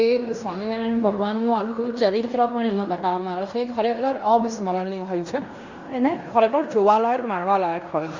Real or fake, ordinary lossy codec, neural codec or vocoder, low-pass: fake; Opus, 64 kbps; codec, 16 kHz in and 24 kHz out, 0.9 kbps, LongCat-Audio-Codec, fine tuned four codebook decoder; 7.2 kHz